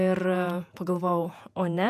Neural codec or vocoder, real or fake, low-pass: vocoder, 48 kHz, 128 mel bands, Vocos; fake; 14.4 kHz